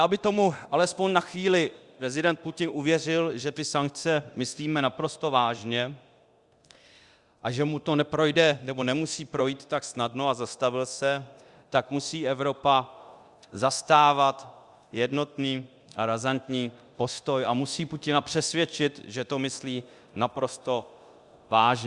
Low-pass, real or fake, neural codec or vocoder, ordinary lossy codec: 10.8 kHz; fake; codec, 24 kHz, 0.9 kbps, DualCodec; Opus, 64 kbps